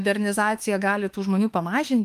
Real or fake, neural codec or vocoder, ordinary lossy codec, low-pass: fake; autoencoder, 48 kHz, 32 numbers a frame, DAC-VAE, trained on Japanese speech; Opus, 32 kbps; 14.4 kHz